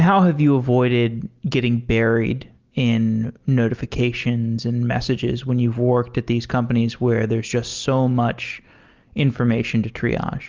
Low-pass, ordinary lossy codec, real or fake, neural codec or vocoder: 7.2 kHz; Opus, 32 kbps; real; none